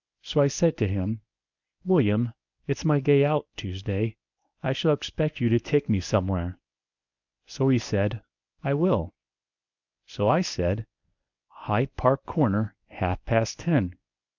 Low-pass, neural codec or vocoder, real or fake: 7.2 kHz; codec, 24 kHz, 0.9 kbps, WavTokenizer, medium speech release version 1; fake